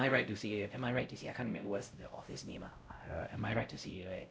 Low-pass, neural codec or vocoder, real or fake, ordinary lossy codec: none; codec, 16 kHz, 0.5 kbps, X-Codec, WavLM features, trained on Multilingual LibriSpeech; fake; none